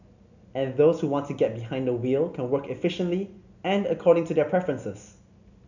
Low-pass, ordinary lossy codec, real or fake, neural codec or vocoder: 7.2 kHz; none; real; none